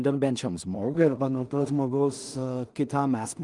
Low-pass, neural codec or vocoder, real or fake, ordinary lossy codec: 10.8 kHz; codec, 16 kHz in and 24 kHz out, 0.4 kbps, LongCat-Audio-Codec, two codebook decoder; fake; Opus, 32 kbps